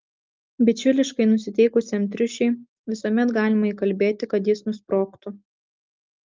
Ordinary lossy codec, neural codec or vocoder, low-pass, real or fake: Opus, 24 kbps; none; 7.2 kHz; real